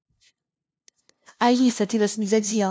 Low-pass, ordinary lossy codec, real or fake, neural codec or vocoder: none; none; fake; codec, 16 kHz, 0.5 kbps, FunCodec, trained on LibriTTS, 25 frames a second